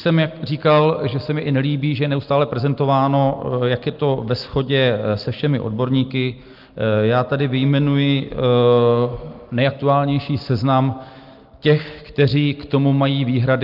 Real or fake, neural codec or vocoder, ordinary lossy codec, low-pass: real; none; Opus, 32 kbps; 5.4 kHz